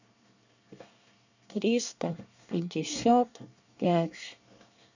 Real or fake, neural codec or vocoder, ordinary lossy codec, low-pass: fake; codec, 24 kHz, 1 kbps, SNAC; none; 7.2 kHz